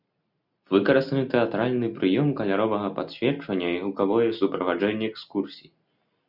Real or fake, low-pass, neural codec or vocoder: real; 5.4 kHz; none